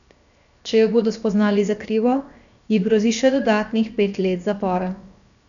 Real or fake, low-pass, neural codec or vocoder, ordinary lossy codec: fake; 7.2 kHz; codec, 16 kHz, 0.7 kbps, FocalCodec; none